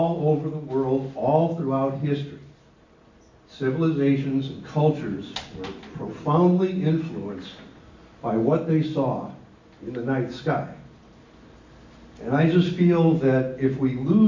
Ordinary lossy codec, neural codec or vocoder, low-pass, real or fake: MP3, 64 kbps; none; 7.2 kHz; real